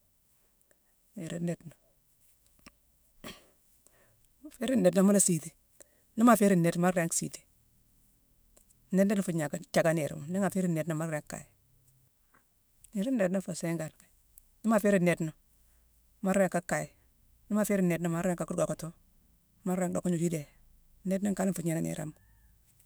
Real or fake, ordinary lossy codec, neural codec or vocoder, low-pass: fake; none; autoencoder, 48 kHz, 128 numbers a frame, DAC-VAE, trained on Japanese speech; none